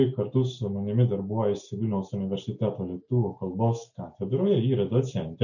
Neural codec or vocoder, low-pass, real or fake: none; 7.2 kHz; real